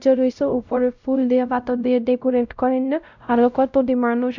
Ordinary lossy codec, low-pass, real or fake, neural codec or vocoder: none; 7.2 kHz; fake; codec, 16 kHz, 0.5 kbps, X-Codec, WavLM features, trained on Multilingual LibriSpeech